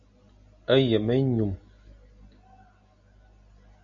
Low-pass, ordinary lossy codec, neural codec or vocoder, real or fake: 7.2 kHz; MP3, 48 kbps; none; real